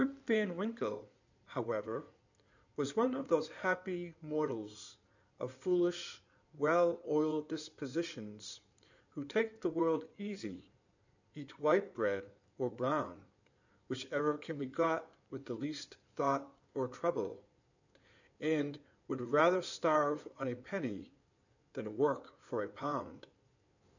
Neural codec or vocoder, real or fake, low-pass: codec, 16 kHz in and 24 kHz out, 2.2 kbps, FireRedTTS-2 codec; fake; 7.2 kHz